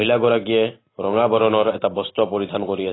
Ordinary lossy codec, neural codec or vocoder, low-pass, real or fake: AAC, 16 kbps; none; 7.2 kHz; real